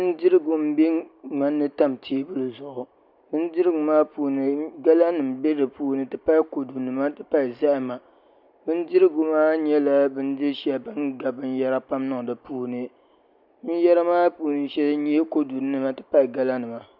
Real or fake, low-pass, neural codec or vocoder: real; 5.4 kHz; none